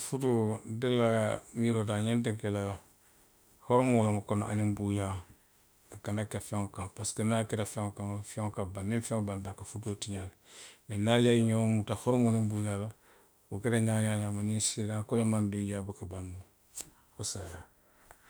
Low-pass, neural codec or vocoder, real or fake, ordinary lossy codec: none; autoencoder, 48 kHz, 32 numbers a frame, DAC-VAE, trained on Japanese speech; fake; none